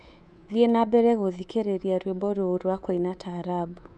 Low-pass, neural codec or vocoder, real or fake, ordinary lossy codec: 10.8 kHz; codec, 24 kHz, 3.1 kbps, DualCodec; fake; none